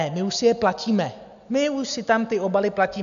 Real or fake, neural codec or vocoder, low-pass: real; none; 7.2 kHz